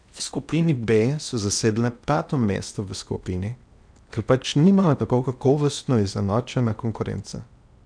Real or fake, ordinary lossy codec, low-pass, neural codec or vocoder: fake; none; 9.9 kHz; codec, 16 kHz in and 24 kHz out, 0.8 kbps, FocalCodec, streaming, 65536 codes